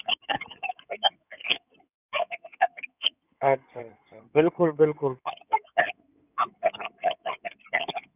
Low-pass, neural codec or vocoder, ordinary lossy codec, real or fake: 3.6 kHz; codec, 16 kHz in and 24 kHz out, 2.2 kbps, FireRedTTS-2 codec; none; fake